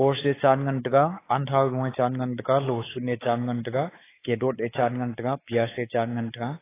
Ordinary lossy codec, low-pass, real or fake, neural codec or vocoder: AAC, 16 kbps; 3.6 kHz; fake; codec, 16 kHz, 4 kbps, X-Codec, WavLM features, trained on Multilingual LibriSpeech